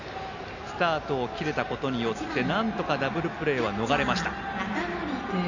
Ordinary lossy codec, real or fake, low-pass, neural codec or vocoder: none; real; 7.2 kHz; none